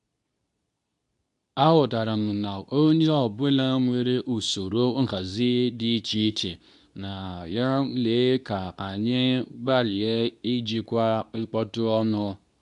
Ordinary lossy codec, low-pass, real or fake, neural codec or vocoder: none; 10.8 kHz; fake; codec, 24 kHz, 0.9 kbps, WavTokenizer, medium speech release version 2